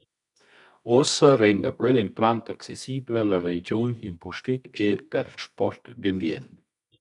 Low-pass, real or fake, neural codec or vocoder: 10.8 kHz; fake; codec, 24 kHz, 0.9 kbps, WavTokenizer, medium music audio release